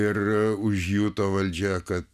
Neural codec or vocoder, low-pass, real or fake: none; 14.4 kHz; real